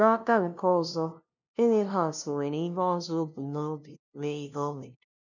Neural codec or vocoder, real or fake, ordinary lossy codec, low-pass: codec, 16 kHz, 0.5 kbps, FunCodec, trained on LibriTTS, 25 frames a second; fake; none; 7.2 kHz